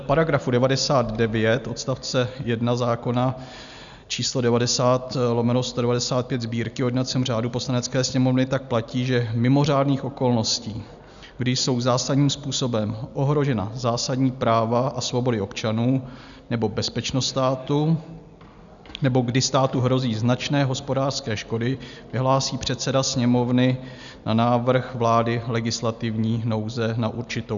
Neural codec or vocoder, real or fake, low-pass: none; real; 7.2 kHz